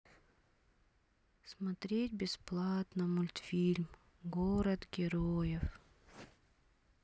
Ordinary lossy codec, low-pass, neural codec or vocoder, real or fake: none; none; none; real